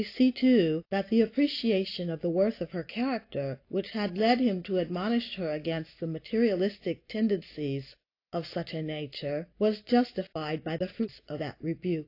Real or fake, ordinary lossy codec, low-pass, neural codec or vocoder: real; AAC, 32 kbps; 5.4 kHz; none